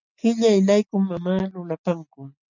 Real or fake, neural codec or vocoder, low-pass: real; none; 7.2 kHz